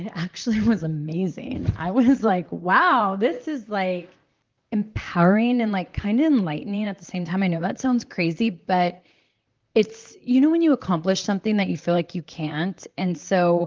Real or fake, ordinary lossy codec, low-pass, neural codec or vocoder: fake; Opus, 24 kbps; 7.2 kHz; vocoder, 44.1 kHz, 128 mel bands, Pupu-Vocoder